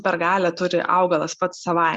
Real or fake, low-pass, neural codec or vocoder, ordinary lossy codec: real; 10.8 kHz; none; Opus, 64 kbps